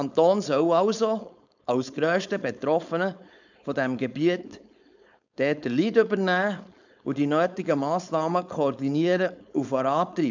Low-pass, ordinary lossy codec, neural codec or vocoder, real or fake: 7.2 kHz; none; codec, 16 kHz, 4.8 kbps, FACodec; fake